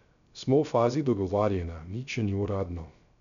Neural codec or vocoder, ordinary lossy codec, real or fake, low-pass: codec, 16 kHz, 0.3 kbps, FocalCodec; none; fake; 7.2 kHz